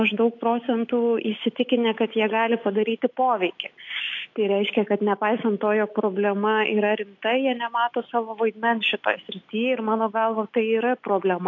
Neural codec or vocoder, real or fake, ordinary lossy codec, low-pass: none; real; AAC, 48 kbps; 7.2 kHz